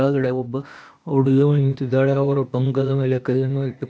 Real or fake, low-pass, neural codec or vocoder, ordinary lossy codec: fake; none; codec, 16 kHz, 0.8 kbps, ZipCodec; none